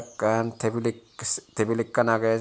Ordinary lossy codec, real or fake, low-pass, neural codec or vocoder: none; real; none; none